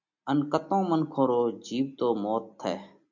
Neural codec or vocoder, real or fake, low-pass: none; real; 7.2 kHz